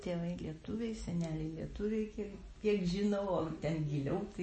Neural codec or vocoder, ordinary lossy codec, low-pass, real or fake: none; MP3, 32 kbps; 9.9 kHz; real